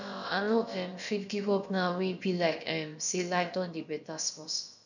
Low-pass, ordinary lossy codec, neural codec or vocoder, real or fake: 7.2 kHz; none; codec, 16 kHz, about 1 kbps, DyCAST, with the encoder's durations; fake